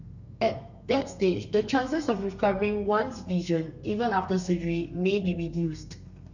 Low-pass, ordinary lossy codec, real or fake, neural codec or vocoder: 7.2 kHz; none; fake; codec, 32 kHz, 1.9 kbps, SNAC